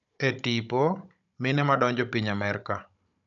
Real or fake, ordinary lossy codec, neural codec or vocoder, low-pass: fake; none; codec, 16 kHz, 16 kbps, FunCodec, trained on Chinese and English, 50 frames a second; 7.2 kHz